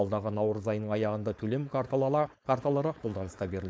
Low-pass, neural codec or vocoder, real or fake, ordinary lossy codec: none; codec, 16 kHz, 4.8 kbps, FACodec; fake; none